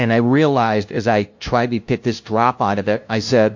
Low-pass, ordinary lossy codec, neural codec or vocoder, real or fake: 7.2 kHz; MP3, 48 kbps; codec, 16 kHz, 0.5 kbps, FunCodec, trained on LibriTTS, 25 frames a second; fake